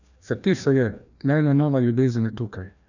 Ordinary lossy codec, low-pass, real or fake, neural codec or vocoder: none; 7.2 kHz; fake; codec, 16 kHz, 1 kbps, FreqCodec, larger model